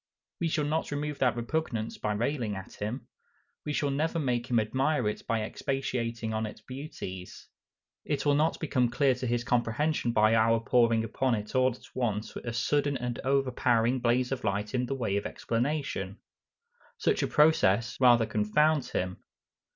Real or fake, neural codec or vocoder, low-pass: real; none; 7.2 kHz